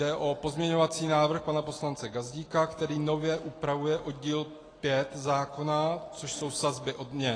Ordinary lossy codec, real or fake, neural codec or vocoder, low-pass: AAC, 32 kbps; real; none; 9.9 kHz